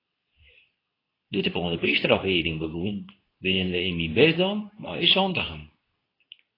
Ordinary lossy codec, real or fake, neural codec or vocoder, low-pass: AAC, 24 kbps; fake; codec, 24 kHz, 0.9 kbps, WavTokenizer, medium speech release version 2; 5.4 kHz